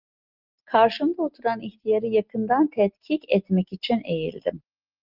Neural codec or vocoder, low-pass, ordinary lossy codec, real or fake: none; 5.4 kHz; Opus, 32 kbps; real